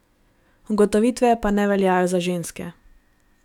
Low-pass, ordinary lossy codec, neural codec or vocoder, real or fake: 19.8 kHz; none; autoencoder, 48 kHz, 128 numbers a frame, DAC-VAE, trained on Japanese speech; fake